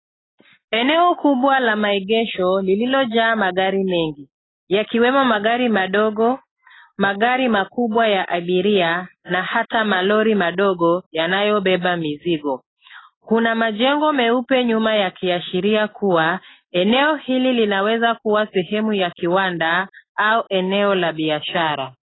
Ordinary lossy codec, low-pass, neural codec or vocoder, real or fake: AAC, 16 kbps; 7.2 kHz; none; real